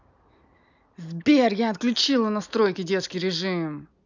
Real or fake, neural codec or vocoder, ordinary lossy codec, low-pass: real; none; none; 7.2 kHz